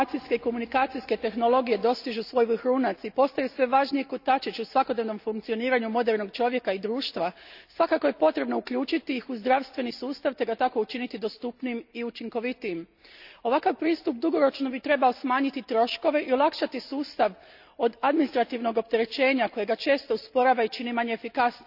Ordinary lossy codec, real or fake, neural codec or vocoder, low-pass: none; real; none; 5.4 kHz